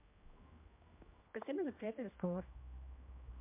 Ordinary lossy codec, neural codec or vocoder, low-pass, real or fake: MP3, 32 kbps; codec, 16 kHz, 1 kbps, X-Codec, HuBERT features, trained on general audio; 3.6 kHz; fake